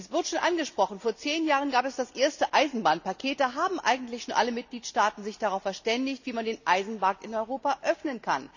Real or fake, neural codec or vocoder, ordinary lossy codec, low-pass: real; none; none; 7.2 kHz